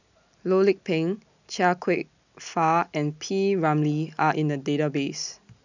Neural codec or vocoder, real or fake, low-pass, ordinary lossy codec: none; real; 7.2 kHz; none